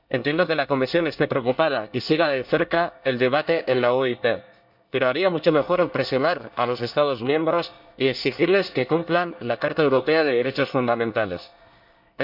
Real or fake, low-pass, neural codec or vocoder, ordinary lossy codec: fake; 5.4 kHz; codec, 24 kHz, 1 kbps, SNAC; none